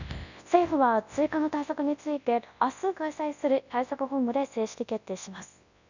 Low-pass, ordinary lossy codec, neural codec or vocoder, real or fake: 7.2 kHz; none; codec, 24 kHz, 0.9 kbps, WavTokenizer, large speech release; fake